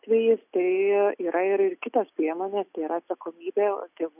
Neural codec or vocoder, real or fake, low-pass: none; real; 3.6 kHz